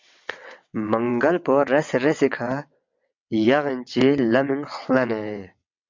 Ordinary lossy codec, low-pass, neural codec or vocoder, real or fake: MP3, 64 kbps; 7.2 kHz; vocoder, 22.05 kHz, 80 mel bands, WaveNeXt; fake